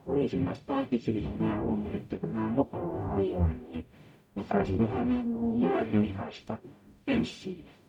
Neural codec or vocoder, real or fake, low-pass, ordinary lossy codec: codec, 44.1 kHz, 0.9 kbps, DAC; fake; 19.8 kHz; none